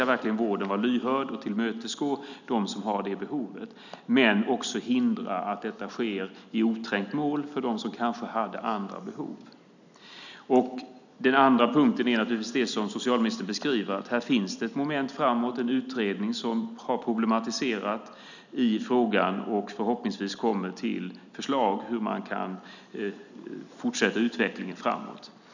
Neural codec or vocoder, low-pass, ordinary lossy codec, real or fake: none; 7.2 kHz; none; real